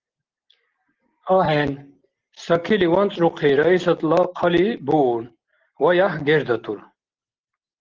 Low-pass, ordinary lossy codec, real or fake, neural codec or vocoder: 7.2 kHz; Opus, 16 kbps; real; none